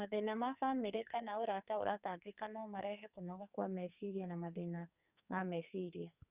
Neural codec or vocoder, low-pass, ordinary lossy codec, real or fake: codec, 44.1 kHz, 2.6 kbps, SNAC; 3.6 kHz; Opus, 64 kbps; fake